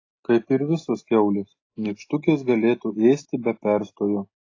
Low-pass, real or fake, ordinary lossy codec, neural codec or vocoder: 7.2 kHz; real; AAC, 32 kbps; none